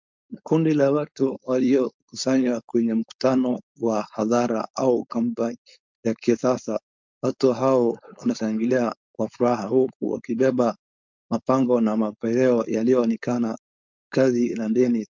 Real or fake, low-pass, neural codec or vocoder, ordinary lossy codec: fake; 7.2 kHz; codec, 16 kHz, 4.8 kbps, FACodec; MP3, 64 kbps